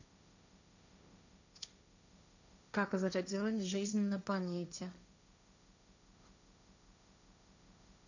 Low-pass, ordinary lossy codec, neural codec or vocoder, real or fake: 7.2 kHz; none; codec, 16 kHz, 1.1 kbps, Voila-Tokenizer; fake